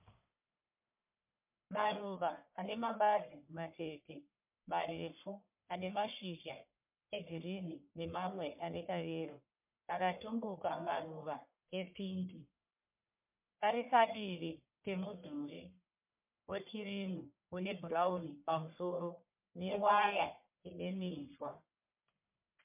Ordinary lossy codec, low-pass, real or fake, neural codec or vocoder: MP3, 32 kbps; 3.6 kHz; fake; codec, 44.1 kHz, 1.7 kbps, Pupu-Codec